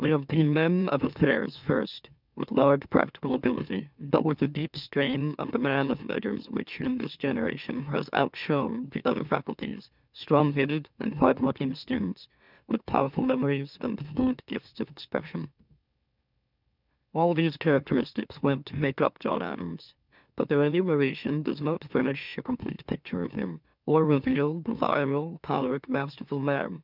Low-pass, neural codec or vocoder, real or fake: 5.4 kHz; autoencoder, 44.1 kHz, a latent of 192 numbers a frame, MeloTTS; fake